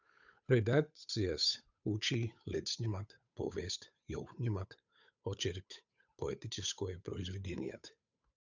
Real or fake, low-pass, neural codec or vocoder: fake; 7.2 kHz; codec, 16 kHz, 8 kbps, FunCodec, trained on Chinese and English, 25 frames a second